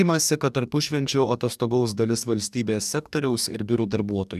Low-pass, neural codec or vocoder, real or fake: 14.4 kHz; codec, 44.1 kHz, 2.6 kbps, DAC; fake